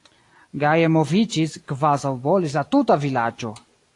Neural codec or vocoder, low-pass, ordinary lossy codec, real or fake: none; 10.8 kHz; AAC, 48 kbps; real